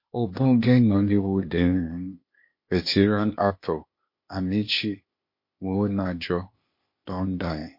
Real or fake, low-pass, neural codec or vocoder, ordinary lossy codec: fake; 5.4 kHz; codec, 16 kHz, 0.8 kbps, ZipCodec; MP3, 32 kbps